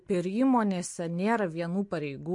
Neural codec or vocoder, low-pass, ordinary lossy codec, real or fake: none; 10.8 kHz; MP3, 48 kbps; real